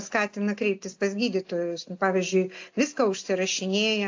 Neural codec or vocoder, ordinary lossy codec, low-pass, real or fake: none; AAC, 48 kbps; 7.2 kHz; real